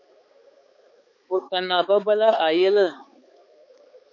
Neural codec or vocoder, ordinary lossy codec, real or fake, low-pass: codec, 16 kHz, 4 kbps, X-Codec, HuBERT features, trained on balanced general audio; MP3, 48 kbps; fake; 7.2 kHz